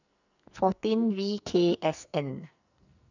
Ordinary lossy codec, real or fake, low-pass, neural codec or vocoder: none; fake; 7.2 kHz; codec, 44.1 kHz, 2.6 kbps, SNAC